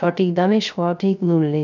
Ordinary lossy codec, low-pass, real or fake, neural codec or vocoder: none; 7.2 kHz; fake; codec, 16 kHz, 0.3 kbps, FocalCodec